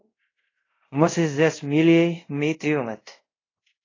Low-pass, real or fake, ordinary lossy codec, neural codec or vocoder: 7.2 kHz; fake; AAC, 32 kbps; codec, 24 kHz, 0.5 kbps, DualCodec